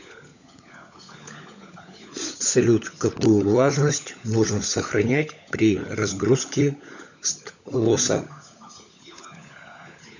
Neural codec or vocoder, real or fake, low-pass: codec, 16 kHz, 16 kbps, FunCodec, trained on LibriTTS, 50 frames a second; fake; 7.2 kHz